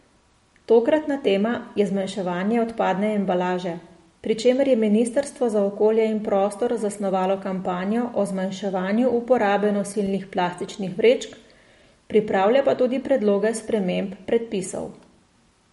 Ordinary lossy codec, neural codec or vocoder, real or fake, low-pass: MP3, 48 kbps; none; real; 14.4 kHz